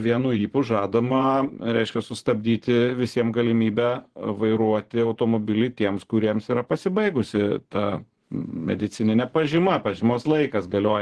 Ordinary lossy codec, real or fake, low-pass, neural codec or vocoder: Opus, 16 kbps; fake; 10.8 kHz; vocoder, 24 kHz, 100 mel bands, Vocos